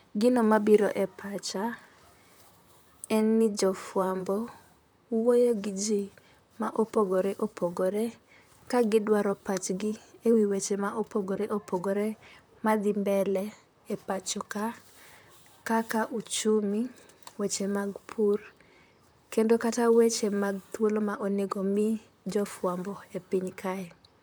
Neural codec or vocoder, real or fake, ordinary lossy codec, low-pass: vocoder, 44.1 kHz, 128 mel bands, Pupu-Vocoder; fake; none; none